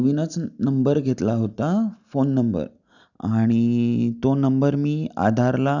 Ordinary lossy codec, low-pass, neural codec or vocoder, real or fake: none; 7.2 kHz; none; real